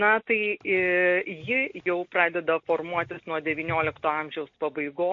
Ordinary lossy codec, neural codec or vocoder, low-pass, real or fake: MP3, 48 kbps; none; 7.2 kHz; real